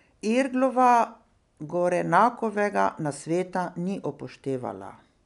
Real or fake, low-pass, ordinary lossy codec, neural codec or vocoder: real; 10.8 kHz; none; none